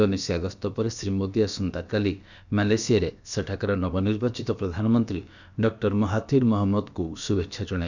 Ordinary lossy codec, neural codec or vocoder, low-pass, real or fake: none; codec, 16 kHz, about 1 kbps, DyCAST, with the encoder's durations; 7.2 kHz; fake